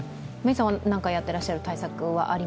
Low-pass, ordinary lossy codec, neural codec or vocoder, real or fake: none; none; none; real